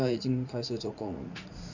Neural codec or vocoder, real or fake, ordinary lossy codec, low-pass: vocoder, 44.1 kHz, 80 mel bands, Vocos; fake; none; 7.2 kHz